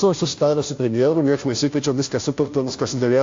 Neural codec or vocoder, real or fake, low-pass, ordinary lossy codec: codec, 16 kHz, 0.5 kbps, FunCodec, trained on Chinese and English, 25 frames a second; fake; 7.2 kHz; AAC, 48 kbps